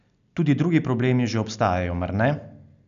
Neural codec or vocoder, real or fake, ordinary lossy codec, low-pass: none; real; none; 7.2 kHz